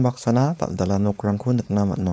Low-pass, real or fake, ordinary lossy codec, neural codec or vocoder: none; fake; none; codec, 16 kHz, 4.8 kbps, FACodec